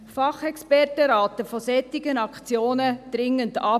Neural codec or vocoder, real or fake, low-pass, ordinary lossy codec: none; real; 14.4 kHz; none